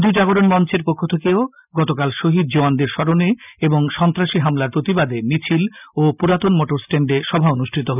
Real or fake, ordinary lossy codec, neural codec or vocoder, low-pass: real; none; none; 3.6 kHz